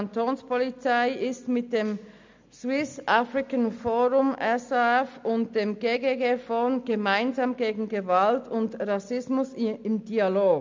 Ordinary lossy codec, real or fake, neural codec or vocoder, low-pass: MP3, 64 kbps; real; none; 7.2 kHz